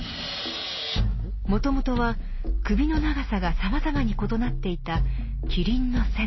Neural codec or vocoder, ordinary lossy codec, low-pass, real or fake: none; MP3, 24 kbps; 7.2 kHz; real